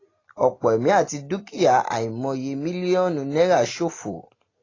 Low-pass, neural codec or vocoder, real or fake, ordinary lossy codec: 7.2 kHz; none; real; AAC, 32 kbps